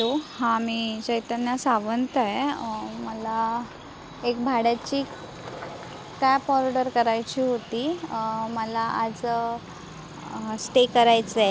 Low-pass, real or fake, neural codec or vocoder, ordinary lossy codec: none; real; none; none